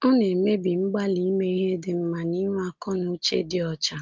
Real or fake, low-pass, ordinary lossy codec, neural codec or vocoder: real; 7.2 kHz; Opus, 32 kbps; none